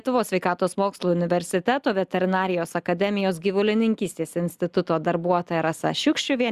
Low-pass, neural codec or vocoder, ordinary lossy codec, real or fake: 14.4 kHz; none; Opus, 64 kbps; real